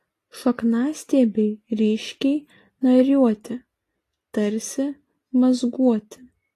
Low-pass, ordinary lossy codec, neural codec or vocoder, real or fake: 14.4 kHz; AAC, 48 kbps; none; real